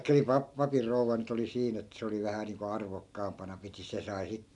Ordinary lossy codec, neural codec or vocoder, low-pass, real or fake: none; none; 10.8 kHz; real